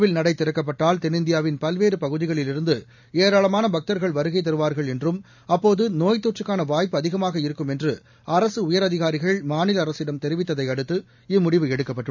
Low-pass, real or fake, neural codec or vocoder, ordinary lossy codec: 7.2 kHz; real; none; none